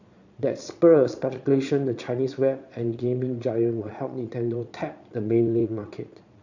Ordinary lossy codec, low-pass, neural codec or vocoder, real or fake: none; 7.2 kHz; vocoder, 22.05 kHz, 80 mel bands, Vocos; fake